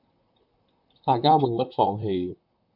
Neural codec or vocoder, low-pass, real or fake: vocoder, 44.1 kHz, 128 mel bands, Pupu-Vocoder; 5.4 kHz; fake